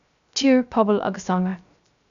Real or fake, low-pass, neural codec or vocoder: fake; 7.2 kHz; codec, 16 kHz, 0.3 kbps, FocalCodec